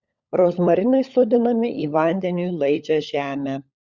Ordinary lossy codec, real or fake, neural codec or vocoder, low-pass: Opus, 64 kbps; fake; codec, 16 kHz, 16 kbps, FunCodec, trained on LibriTTS, 50 frames a second; 7.2 kHz